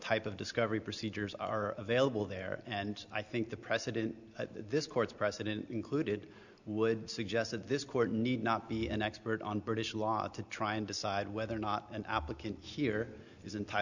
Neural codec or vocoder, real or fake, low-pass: none; real; 7.2 kHz